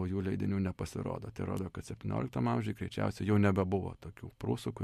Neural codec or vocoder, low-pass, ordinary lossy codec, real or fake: none; 14.4 kHz; MP3, 64 kbps; real